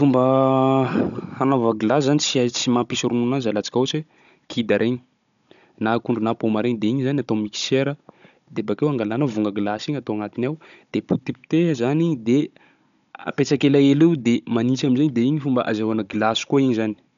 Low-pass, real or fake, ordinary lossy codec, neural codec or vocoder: 7.2 kHz; fake; none; codec, 16 kHz, 16 kbps, FunCodec, trained on Chinese and English, 50 frames a second